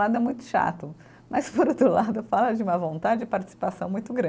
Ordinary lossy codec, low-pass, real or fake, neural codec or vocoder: none; none; real; none